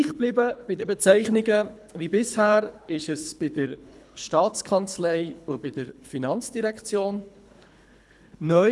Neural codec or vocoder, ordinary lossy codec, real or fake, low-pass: codec, 24 kHz, 3 kbps, HILCodec; none; fake; none